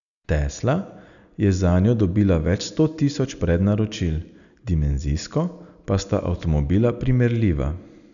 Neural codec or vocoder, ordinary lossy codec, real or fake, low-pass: none; none; real; 7.2 kHz